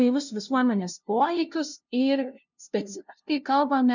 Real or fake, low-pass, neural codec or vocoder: fake; 7.2 kHz; codec, 16 kHz, 0.5 kbps, FunCodec, trained on LibriTTS, 25 frames a second